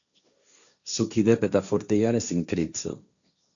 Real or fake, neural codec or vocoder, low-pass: fake; codec, 16 kHz, 1.1 kbps, Voila-Tokenizer; 7.2 kHz